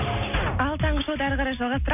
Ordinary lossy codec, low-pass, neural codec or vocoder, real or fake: none; 3.6 kHz; none; real